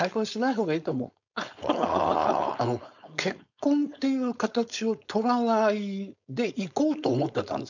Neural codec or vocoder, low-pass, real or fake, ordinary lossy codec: codec, 16 kHz, 4.8 kbps, FACodec; 7.2 kHz; fake; none